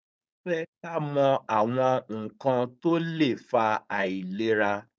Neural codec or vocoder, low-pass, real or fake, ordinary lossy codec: codec, 16 kHz, 4.8 kbps, FACodec; none; fake; none